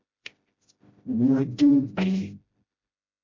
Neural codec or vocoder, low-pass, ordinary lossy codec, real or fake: codec, 16 kHz, 0.5 kbps, FreqCodec, smaller model; 7.2 kHz; MP3, 48 kbps; fake